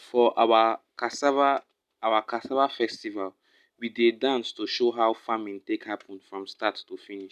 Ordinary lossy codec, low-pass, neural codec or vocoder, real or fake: none; 14.4 kHz; none; real